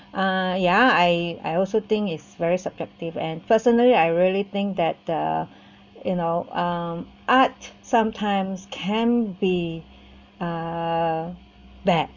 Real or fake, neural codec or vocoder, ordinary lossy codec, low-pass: real; none; none; 7.2 kHz